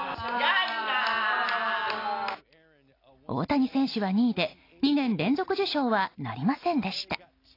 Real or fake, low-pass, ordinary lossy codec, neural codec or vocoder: real; 5.4 kHz; AAC, 32 kbps; none